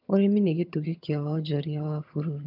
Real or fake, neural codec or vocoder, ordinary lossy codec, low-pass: fake; vocoder, 22.05 kHz, 80 mel bands, HiFi-GAN; none; 5.4 kHz